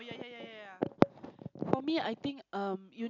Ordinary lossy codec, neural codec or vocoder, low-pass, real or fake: none; none; 7.2 kHz; real